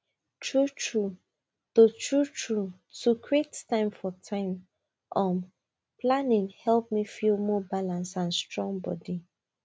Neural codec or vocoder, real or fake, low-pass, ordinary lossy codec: none; real; none; none